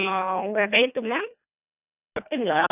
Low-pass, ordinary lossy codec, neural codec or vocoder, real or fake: 3.6 kHz; none; codec, 24 kHz, 1.5 kbps, HILCodec; fake